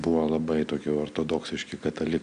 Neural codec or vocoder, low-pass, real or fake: none; 9.9 kHz; real